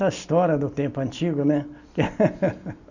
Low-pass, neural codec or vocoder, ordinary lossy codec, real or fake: 7.2 kHz; none; none; real